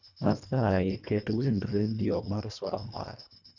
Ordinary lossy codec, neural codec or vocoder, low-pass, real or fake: none; codec, 24 kHz, 1.5 kbps, HILCodec; 7.2 kHz; fake